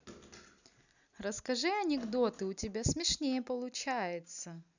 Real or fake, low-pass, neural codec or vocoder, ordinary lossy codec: real; 7.2 kHz; none; none